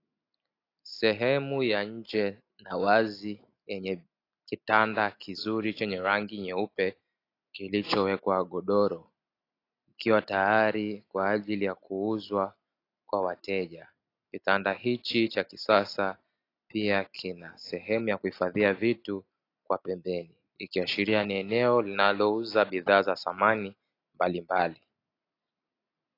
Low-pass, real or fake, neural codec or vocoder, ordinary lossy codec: 5.4 kHz; real; none; AAC, 32 kbps